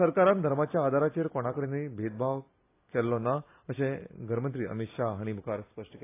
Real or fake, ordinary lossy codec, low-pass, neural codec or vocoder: real; AAC, 24 kbps; 3.6 kHz; none